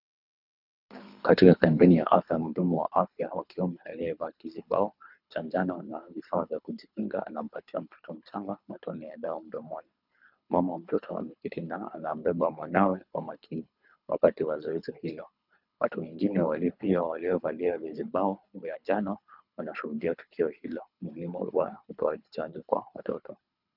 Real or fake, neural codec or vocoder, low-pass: fake; codec, 24 kHz, 3 kbps, HILCodec; 5.4 kHz